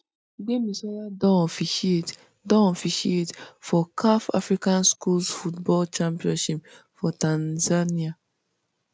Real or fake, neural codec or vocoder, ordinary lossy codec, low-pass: real; none; none; none